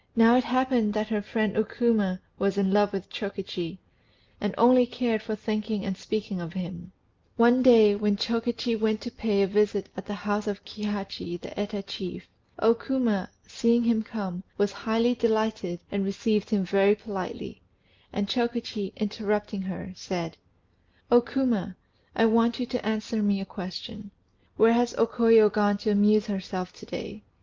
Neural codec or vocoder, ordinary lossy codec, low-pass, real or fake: none; Opus, 24 kbps; 7.2 kHz; real